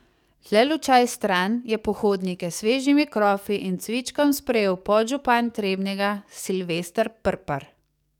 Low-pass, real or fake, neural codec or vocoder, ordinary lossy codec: 19.8 kHz; fake; codec, 44.1 kHz, 7.8 kbps, DAC; none